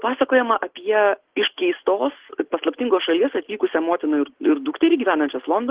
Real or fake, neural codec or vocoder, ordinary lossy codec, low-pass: real; none; Opus, 16 kbps; 3.6 kHz